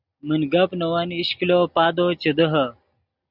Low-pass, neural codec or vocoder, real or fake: 5.4 kHz; none; real